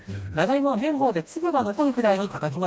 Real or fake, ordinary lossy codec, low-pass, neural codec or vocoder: fake; none; none; codec, 16 kHz, 1 kbps, FreqCodec, smaller model